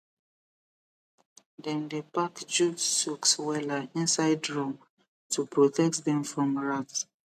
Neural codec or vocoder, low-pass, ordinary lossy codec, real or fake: none; 14.4 kHz; none; real